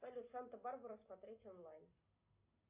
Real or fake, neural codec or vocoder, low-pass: real; none; 3.6 kHz